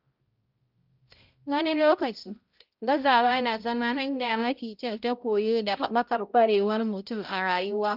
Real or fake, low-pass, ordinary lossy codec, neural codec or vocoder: fake; 5.4 kHz; Opus, 32 kbps; codec, 16 kHz, 0.5 kbps, X-Codec, HuBERT features, trained on balanced general audio